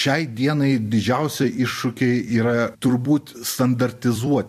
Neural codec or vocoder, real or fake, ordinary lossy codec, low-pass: vocoder, 44.1 kHz, 128 mel bands every 256 samples, BigVGAN v2; fake; MP3, 64 kbps; 14.4 kHz